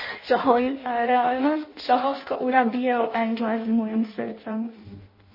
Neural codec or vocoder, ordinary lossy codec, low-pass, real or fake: codec, 16 kHz in and 24 kHz out, 0.6 kbps, FireRedTTS-2 codec; MP3, 24 kbps; 5.4 kHz; fake